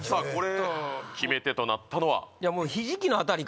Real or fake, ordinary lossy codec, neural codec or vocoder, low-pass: real; none; none; none